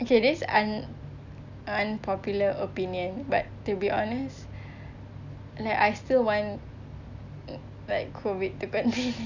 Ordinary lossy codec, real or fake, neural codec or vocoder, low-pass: none; real; none; 7.2 kHz